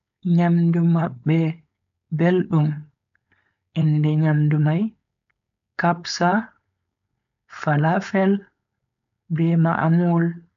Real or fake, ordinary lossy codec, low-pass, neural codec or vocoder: fake; MP3, 64 kbps; 7.2 kHz; codec, 16 kHz, 4.8 kbps, FACodec